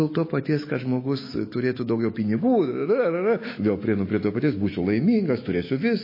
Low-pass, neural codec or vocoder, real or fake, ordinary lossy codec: 5.4 kHz; autoencoder, 48 kHz, 128 numbers a frame, DAC-VAE, trained on Japanese speech; fake; MP3, 24 kbps